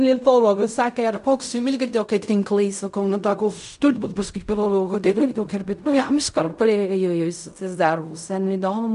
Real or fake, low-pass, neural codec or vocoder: fake; 10.8 kHz; codec, 16 kHz in and 24 kHz out, 0.4 kbps, LongCat-Audio-Codec, fine tuned four codebook decoder